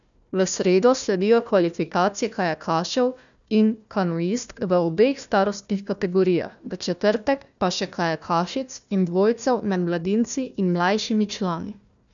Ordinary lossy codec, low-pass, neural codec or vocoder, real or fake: none; 7.2 kHz; codec, 16 kHz, 1 kbps, FunCodec, trained on Chinese and English, 50 frames a second; fake